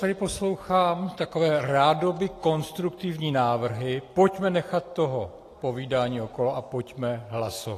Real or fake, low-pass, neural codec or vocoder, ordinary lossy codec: real; 14.4 kHz; none; AAC, 48 kbps